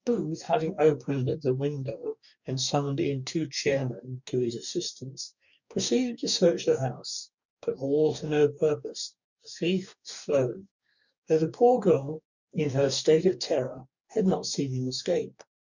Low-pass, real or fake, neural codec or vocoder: 7.2 kHz; fake; codec, 44.1 kHz, 2.6 kbps, DAC